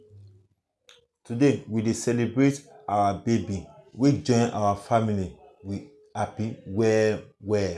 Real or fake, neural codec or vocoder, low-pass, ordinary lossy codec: real; none; none; none